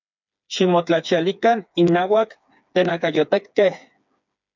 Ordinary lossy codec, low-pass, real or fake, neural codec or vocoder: MP3, 64 kbps; 7.2 kHz; fake; codec, 16 kHz, 4 kbps, FreqCodec, smaller model